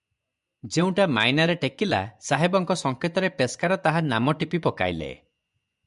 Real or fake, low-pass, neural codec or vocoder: fake; 10.8 kHz; vocoder, 24 kHz, 100 mel bands, Vocos